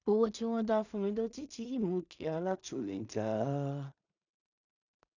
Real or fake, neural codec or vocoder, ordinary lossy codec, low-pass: fake; codec, 16 kHz in and 24 kHz out, 0.4 kbps, LongCat-Audio-Codec, two codebook decoder; none; 7.2 kHz